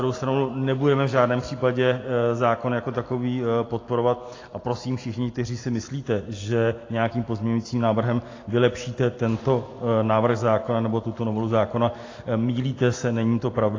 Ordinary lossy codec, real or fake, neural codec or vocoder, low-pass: AAC, 32 kbps; real; none; 7.2 kHz